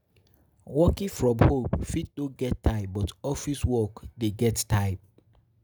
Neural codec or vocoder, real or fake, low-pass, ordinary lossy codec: none; real; none; none